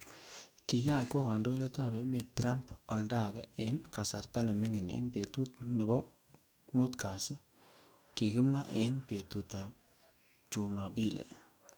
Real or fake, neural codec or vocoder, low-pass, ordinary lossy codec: fake; codec, 44.1 kHz, 2.6 kbps, DAC; none; none